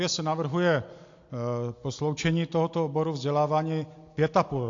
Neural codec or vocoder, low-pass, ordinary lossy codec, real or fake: none; 7.2 kHz; AAC, 48 kbps; real